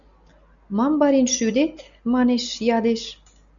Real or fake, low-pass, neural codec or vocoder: real; 7.2 kHz; none